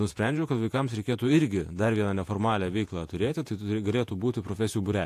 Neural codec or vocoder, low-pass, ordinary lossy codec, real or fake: vocoder, 48 kHz, 128 mel bands, Vocos; 14.4 kHz; AAC, 64 kbps; fake